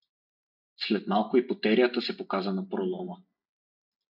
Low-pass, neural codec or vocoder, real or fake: 5.4 kHz; none; real